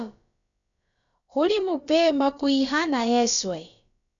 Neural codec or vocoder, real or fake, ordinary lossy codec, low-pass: codec, 16 kHz, about 1 kbps, DyCAST, with the encoder's durations; fake; MP3, 96 kbps; 7.2 kHz